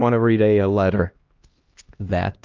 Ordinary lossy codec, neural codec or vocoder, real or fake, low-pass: Opus, 24 kbps; codec, 16 kHz, 1 kbps, X-Codec, HuBERT features, trained on LibriSpeech; fake; 7.2 kHz